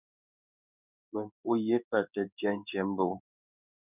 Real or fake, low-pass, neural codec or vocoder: fake; 3.6 kHz; codec, 16 kHz in and 24 kHz out, 1 kbps, XY-Tokenizer